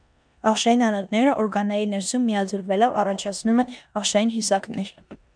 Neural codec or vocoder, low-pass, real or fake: codec, 16 kHz in and 24 kHz out, 0.9 kbps, LongCat-Audio-Codec, four codebook decoder; 9.9 kHz; fake